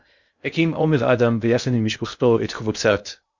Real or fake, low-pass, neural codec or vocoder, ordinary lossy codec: fake; 7.2 kHz; codec, 16 kHz in and 24 kHz out, 0.6 kbps, FocalCodec, streaming, 2048 codes; Opus, 64 kbps